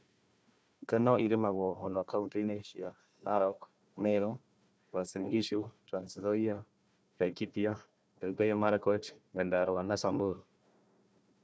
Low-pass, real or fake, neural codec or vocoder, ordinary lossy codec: none; fake; codec, 16 kHz, 1 kbps, FunCodec, trained on Chinese and English, 50 frames a second; none